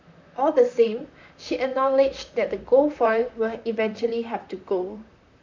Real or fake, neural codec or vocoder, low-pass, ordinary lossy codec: fake; vocoder, 44.1 kHz, 128 mel bands, Pupu-Vocoder; 7.2 kHz; MP3, 64 kbps